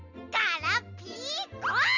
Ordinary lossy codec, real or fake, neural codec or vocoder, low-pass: none; real; none; 7.2 kHz